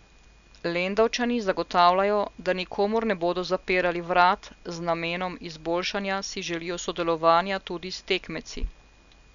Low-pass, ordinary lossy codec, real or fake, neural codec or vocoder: 7.2 kHz; none; real; none